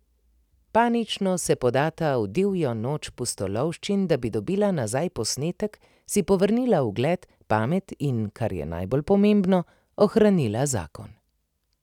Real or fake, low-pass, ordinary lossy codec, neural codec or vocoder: real; 19.8 kHz; none; none